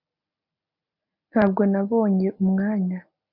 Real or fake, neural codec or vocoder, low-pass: real; none; 5.4 kHz